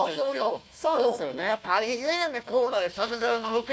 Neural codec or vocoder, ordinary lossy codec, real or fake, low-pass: codec, 16 kHz, 1 kbps, FunCodec, trained on Chinese and English, 50 frames a second; none; fake; none